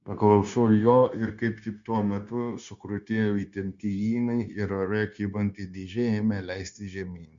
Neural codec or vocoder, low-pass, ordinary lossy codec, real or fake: codec, 16 kHz, 0.9 kbps, LongCat-Audio-Codec; 7.2 kHz; Opus, 64 kbps; fake